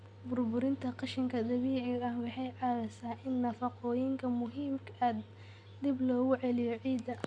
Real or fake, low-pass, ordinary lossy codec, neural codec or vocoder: real; 9.9 kHz; none; none